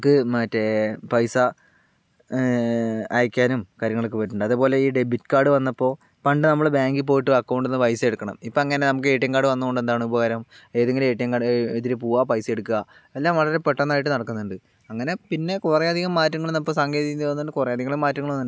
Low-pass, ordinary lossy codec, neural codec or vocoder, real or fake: none; none; none; real